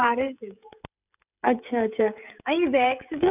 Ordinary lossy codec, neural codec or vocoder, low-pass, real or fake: none; codec, 16 kHz, 16 kbps, FreqCodec, smaller model; 3.6 kHz; fake